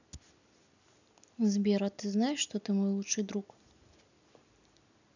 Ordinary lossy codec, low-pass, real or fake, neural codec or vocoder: none; 7.2 kHz; real; none